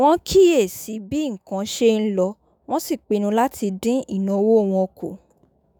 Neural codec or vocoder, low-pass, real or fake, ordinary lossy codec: autoencoder, 48 kHz, 128 numbers a frame, DAC-VAE, trained on Japanese speech; none; fake; none